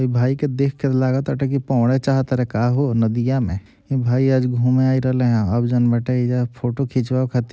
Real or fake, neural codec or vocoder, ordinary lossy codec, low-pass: real; none; none; none